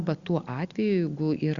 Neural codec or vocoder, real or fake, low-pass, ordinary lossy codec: none; real; 7.2 kHz; Opus, 64 kbps